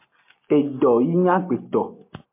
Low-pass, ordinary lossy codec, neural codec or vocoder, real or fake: 3.6 kHz; MP3, 24 kbps; none; real